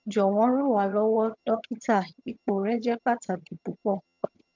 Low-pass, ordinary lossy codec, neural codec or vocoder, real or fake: 7.2 kHz; MP3, 64 kbps; vocoder, 22.05 kHz, 80 mel bands, HiFi-GAN; fake